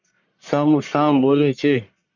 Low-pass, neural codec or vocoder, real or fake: 7.2 kHz; codec, 44.1 kHz, 1.7 kbps, Pupu-Codec; fake